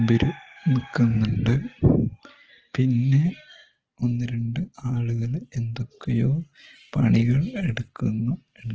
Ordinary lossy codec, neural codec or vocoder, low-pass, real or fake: Opus, 16 kbps; none; 7.2 kHz; real